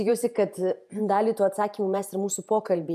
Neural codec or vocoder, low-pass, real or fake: vocoder, 48 kHz, 128 mel bands, Vocos; 14.4 kHz; fake